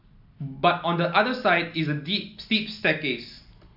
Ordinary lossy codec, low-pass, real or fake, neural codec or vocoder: AAC, 48 kbps; 5.4 kHz; real; none